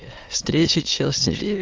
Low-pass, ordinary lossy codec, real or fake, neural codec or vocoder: 7.2 kHz; Opus, 24 kbps; fake; autoencoder, 22.05 kHz, a latent of 192 numbers a frame, VITS, trained on many speakers